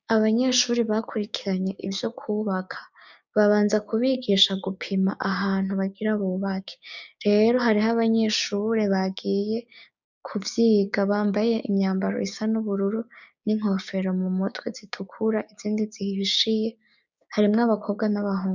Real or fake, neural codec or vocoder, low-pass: fake; codec, 16 kHz, 6 kbps, DAC; 7.2 kHz